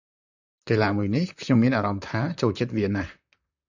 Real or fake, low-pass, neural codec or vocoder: fake; 7.2 kHz; vocoder, 44.1 kHz, 128 mel bands, Pupu-Vocoder